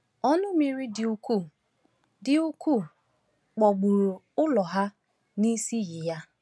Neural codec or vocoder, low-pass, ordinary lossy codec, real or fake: none; none; none; real